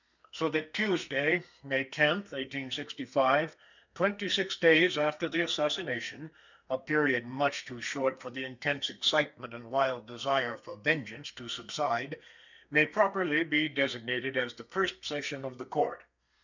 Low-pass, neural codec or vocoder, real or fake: 7.2 kHz; codec, 32 kHz, 1.9 kbps, SNAC; fake